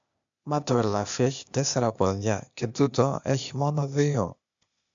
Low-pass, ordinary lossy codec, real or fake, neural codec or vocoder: 7.2 kHz; MP3, 64 kbps; fake; codec, 16 kHz, 0.8 kbps, ZipCodec